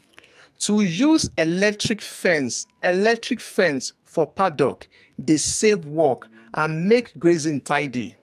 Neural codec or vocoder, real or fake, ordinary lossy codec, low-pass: codec, 44.1 kHz, 2.6 kbps, SNAC; fake; AAC, 96 kbps; 14.4 kHz